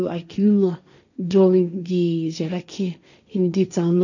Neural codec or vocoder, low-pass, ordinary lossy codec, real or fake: codec, 16 kHz, 1.1 kbps, Voila-Tokenizer; 7.2 kHz; none; fake